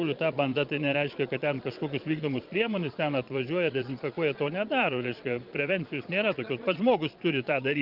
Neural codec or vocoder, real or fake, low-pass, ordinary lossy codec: vocoder, 22.05 kHz, 80 mel bands, Vocos; fake; 5.4 kHz; Opus, 32 kbps